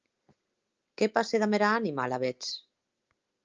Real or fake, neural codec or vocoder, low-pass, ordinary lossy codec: real; none; 7.2 kHz; Opus, 24 kbps